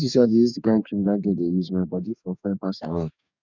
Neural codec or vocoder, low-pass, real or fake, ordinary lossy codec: codec, 44.1 kHz, 2.6 kbps, DAC; 7.2 kHz; fake; none